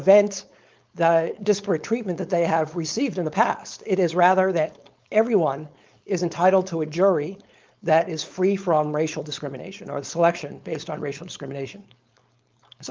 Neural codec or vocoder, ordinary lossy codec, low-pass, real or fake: codec, 16 kHz, 4.8 kbps, FACodec; Opus, 24 kbps; 7.2 kHz; fake